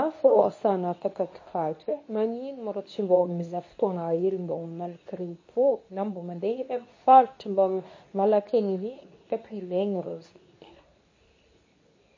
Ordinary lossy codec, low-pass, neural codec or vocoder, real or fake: MP3, 32 kbps; 7.2 kHz; codec, 24 kHz, 0.9 kbps, WavTokenizer, medium speech release version 2; fake